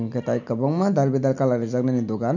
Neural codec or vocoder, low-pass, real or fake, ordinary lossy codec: none; 7.2 kHz; real; none